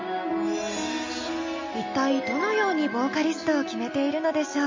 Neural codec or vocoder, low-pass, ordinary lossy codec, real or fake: none; 7.2 kHz; MP3, 32 kbps; real